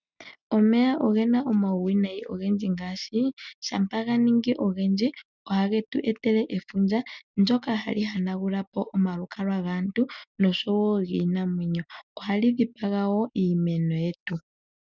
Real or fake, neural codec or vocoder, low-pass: real; none; 7.2 kHz